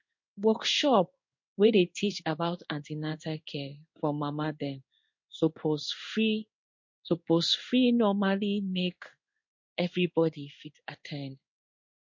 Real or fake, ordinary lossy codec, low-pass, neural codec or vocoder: fake; MP3, 48 kbps; 7.2 kHz; codec, 16 kHz in and 24 kHz out, 1 kbps, XY-Tokenizer